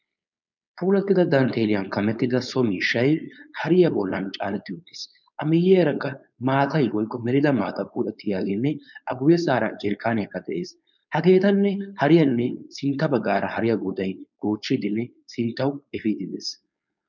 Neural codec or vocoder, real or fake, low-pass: codec, 16 kHz, 4.8 kbps, FACodec; fake; 7.2 kHz